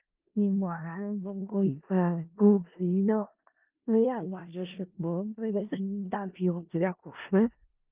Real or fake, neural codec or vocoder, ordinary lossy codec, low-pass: fake; codec, 16 kHz in and 24 kHz out, 0.4 kbps, LongCat-Audio-Codec, four codebook decoder; Opus, 32 kbps; 3.6 kHz